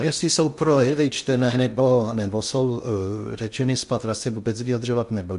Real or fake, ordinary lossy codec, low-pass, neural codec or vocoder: fake; MP3, 64 kbps; 10.8 kHz; codec, 16 kHz in and 24 kHz out, 0.6 kbps, FocalCodec, streaming, 2048 codes